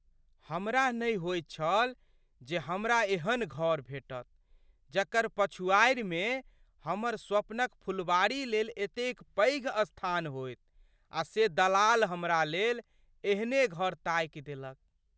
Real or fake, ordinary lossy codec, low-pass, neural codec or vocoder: real; none; none; none